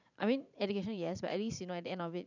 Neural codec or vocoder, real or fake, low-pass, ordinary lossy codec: vocoder, 44.1 kHz, 80 mel bands, Vocos; fake; 7.2 kHz; none